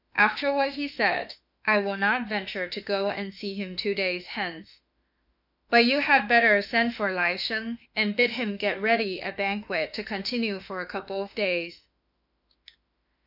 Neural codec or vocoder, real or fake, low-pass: autoencoder, 48 kHz, 32 numbers a frame, DAC-VAE, trained on Japanese speech; fake; 5.4 kHz